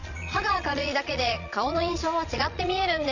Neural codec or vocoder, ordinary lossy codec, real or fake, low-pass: vocoder, 22.05 kHz, 80 mel bands, Vocos; none; fake; 7.2 kHz